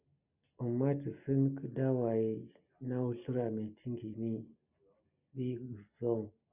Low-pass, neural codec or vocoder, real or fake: 3.6 kHz; none; real